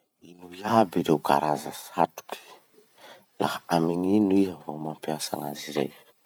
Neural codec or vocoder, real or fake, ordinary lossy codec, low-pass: vocoder, 44.1 kHz, 128 mel bands every 512 samples, BigVGAN v2; fake; none; none